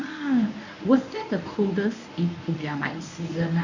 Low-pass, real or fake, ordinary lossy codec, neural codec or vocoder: 7.2 kHz; fake; none; codec, 24 kHz, 0.9 kbps, WavTokenizer, medium speech release version 1